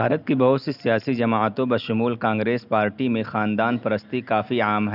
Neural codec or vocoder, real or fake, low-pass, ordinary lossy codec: none; real; 5.4 kHz; none